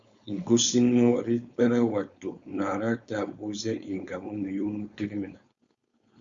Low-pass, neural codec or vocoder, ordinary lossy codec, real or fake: 7.2 kHz; codec, 16 kHz, 4.8 kbps, FACodec; Opus, 64 kbps; fake